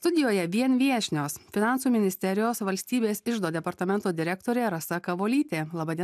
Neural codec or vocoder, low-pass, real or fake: none; 14.4 kHz; real